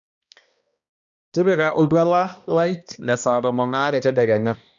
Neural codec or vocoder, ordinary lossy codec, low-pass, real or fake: codec, 16 kHz, 1 kbps, X-Codec, HuBERT features, trained on balanced general audio; none; 7.2 kHz; fake